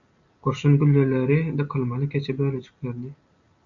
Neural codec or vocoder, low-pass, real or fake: none; 7.2 kHz; real